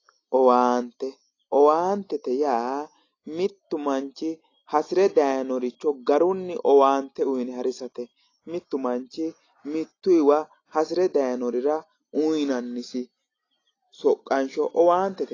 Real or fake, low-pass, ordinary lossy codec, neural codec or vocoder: real; 7.2 kHz; AAC, 32 kbps; none